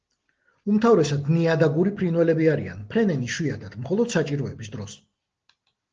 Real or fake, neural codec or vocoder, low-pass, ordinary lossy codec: real; none; 7.2 kHz; Opus, 16 kbps